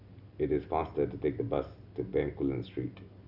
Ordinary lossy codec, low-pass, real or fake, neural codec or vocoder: none; 5.4 kHz; real; none